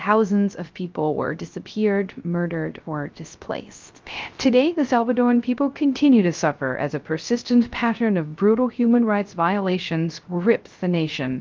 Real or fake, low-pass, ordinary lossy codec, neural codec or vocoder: fake; 7.2 kHz; Opus, 32 kbps; codec, 16 kHz, 0.3 kbps, FocalCodec